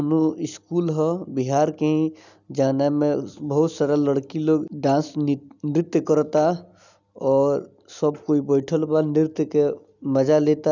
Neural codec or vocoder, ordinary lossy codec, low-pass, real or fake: none; none; 7.2 kHz; real